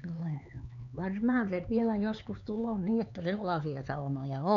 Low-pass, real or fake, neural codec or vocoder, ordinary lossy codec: 7.2 kHz; fake; codec, 16 kHz, 4 kbps, X-Codec, HuBERT features, trained on LibriSpeech; none